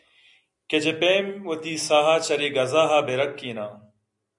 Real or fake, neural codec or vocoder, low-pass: real; none; 10.8 kHz